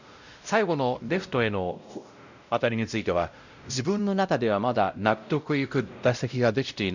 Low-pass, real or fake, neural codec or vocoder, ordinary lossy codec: 7.2 kHz; fake; codec, 16 kHz, 0.5 kbps, X-Codec, WavLM features, trained on Multilingual LibriSpeech; none